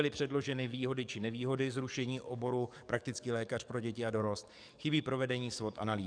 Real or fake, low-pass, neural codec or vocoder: fake; 9.9 kHz; codec, 44.1 kHz, 7.8 kbps, DAC